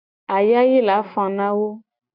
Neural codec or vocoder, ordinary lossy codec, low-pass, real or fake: none; AAC, 48 kbps; 5.4 kHz; real